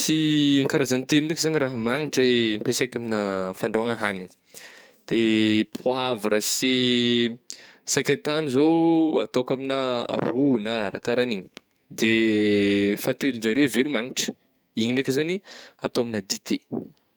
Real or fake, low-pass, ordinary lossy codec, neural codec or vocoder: fake; none; none; codec, 44.1 kHz, 2.6 kbps, SNAC